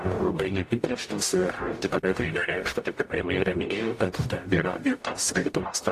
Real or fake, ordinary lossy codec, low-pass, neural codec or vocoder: fake; AAC, 64 kbps; 14.4 kHz; codec, 44.1 kHz, 0.9 kbps, DAC